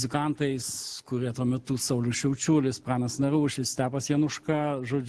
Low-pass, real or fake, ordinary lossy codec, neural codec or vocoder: 10.8 kHz; real; Opus, 16 kbps; none